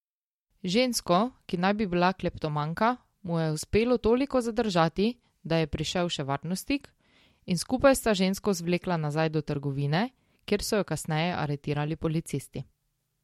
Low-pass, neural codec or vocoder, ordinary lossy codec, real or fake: 19.8 kHz; none; MP3, 64 kbps; real